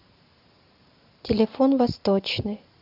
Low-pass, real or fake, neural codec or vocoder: 5.4 kHz; fake; vocoder, 44.1 kHz, 128 mel bands every 512 samples, BigVGAN v2